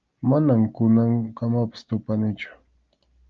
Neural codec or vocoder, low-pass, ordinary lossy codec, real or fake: none; 7.2 kHz; Opus, 24 kbps; real